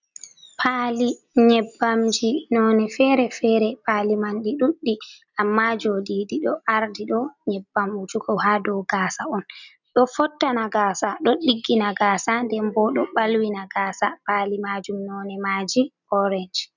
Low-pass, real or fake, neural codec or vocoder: 7.2 kHz; real; none